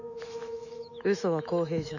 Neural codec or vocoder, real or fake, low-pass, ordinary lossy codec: autoencoder, 48 kHz, 128 numbers a frame, DAC-VAE, trained on Japanese speech; fake; 7.2 kHz; none